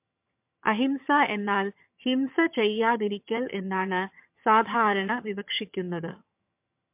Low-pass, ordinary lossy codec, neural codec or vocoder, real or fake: 3.6 kHz; MP3, 32 kbps; vocoder, 22.05 kHz, 80 mel bands, HiFi-GAN; fake